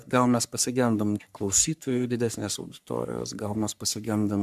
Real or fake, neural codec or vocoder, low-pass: fake; codec, 44.1 kHz, 3.4 kbps, Pupu-Codec; 14.4 kHz